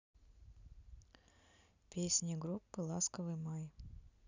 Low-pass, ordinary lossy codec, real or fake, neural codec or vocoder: 7.2 kHz; none; real; none